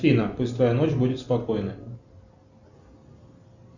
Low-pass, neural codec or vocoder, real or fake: 7.2 kHz; none; real